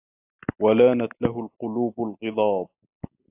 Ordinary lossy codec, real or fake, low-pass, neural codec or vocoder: AAC, 24 kbps; real; 3.6 kHz; none